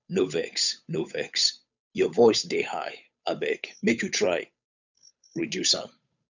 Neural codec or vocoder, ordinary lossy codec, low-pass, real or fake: codec, 16 kHz, 8 kbps, FunCodec, trained on Chinese and English, 25 frames a second; none; 7.2 kHz; fake